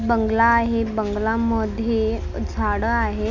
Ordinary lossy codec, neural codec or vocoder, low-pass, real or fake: none; none; 7.2 kHz; real